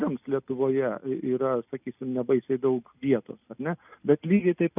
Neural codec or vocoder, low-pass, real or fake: none; 3.6 kHz; real